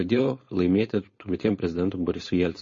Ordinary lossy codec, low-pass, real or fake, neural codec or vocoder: MP3, 32 kbps; 7.2 kHz; fake; codec, 16 kHz, 4.8 kbps, FACodec